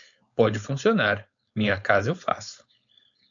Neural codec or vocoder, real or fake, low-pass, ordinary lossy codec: codec, 16 kHz, 4.8 kbps, FACodec; fake; 7.2 kHz; AAC, 64 kbps